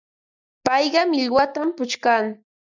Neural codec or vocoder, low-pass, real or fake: none; 7.2 kHz; real